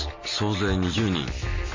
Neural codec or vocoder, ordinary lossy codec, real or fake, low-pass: none; MP3, 32 kbps; real; 7.2 kHz